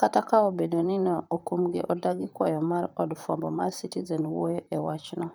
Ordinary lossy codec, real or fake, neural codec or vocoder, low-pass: none; fake; vocoder, 44.1 kHz, 128 mel bands, Pupu-Vocoder; none